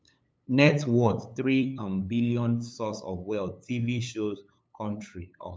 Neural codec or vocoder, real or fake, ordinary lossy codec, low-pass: codec, 16 kHz, 8 kbps, FunCodec, trained on LibriTTS, 25 frames a second; fake; none; none